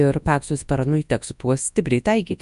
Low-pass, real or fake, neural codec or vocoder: 10.8 kHz; fake; codec, 24 kHz, 0.9 kbps, WavTokenizer, large speech release